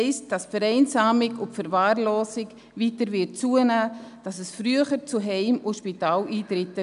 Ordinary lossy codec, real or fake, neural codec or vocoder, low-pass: none; real; none; 10.8 kHz